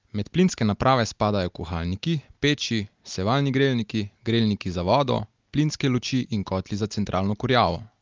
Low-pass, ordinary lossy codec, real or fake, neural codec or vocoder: 7.2 kHz; Opus, 32 kbps; real; none